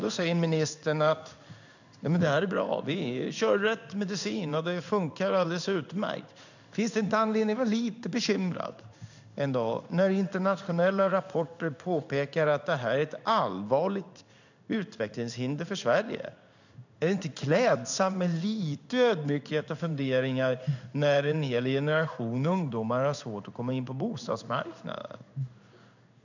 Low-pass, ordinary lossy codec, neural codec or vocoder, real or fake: 7.2 kHz; none; codec, 16 kHz in and 24 kHz out, 1 kbps, XY-Tokenizer; fake